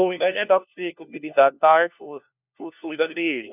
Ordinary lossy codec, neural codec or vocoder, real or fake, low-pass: none; codec, 16 kHz, 1 kbps, FunCodec, trained on LibriTTS, 50 frames a second; fake; 3.6 kHz